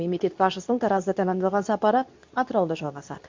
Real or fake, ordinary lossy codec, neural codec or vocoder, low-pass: fake; MP3, 64 kbps; codec, 24 kHz, 0.9 kbps, WavTokenizer, medium speech release version 2; 7.2 kHz